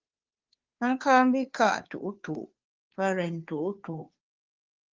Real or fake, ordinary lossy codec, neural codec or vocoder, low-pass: fake; Opus, 32 kbps; codec, 16 kHz, 2 kbps, FunCodec, trained on Chinese and English, 25 frames a second; 7.2 kHz